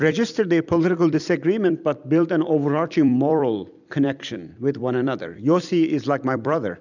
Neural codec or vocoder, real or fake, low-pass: vocoder, 44.1 kHz, 128 mel bands every 256 samples, BigVGAN v2; fake; 7.2 kHz